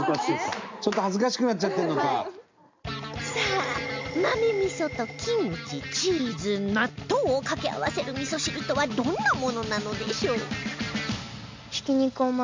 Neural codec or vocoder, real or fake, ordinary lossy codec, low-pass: none; real; MP3, 64 kbps; 7.2 kHz